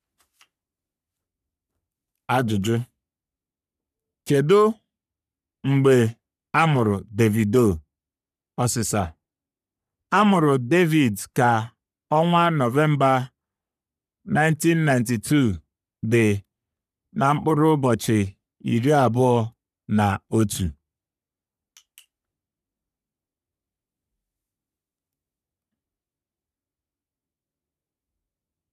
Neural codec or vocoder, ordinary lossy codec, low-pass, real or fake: codec, 44.1 kHz, 3.4 kbps, Pupu-Codec; none; 14.4 kHz; fake